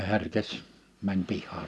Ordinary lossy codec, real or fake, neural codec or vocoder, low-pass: none; real; none; none